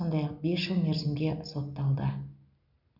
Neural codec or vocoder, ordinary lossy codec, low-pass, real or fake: none; none; 5.4 kHz; real